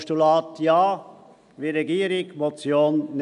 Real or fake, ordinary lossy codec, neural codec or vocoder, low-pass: real; none; none; 10.8 kHz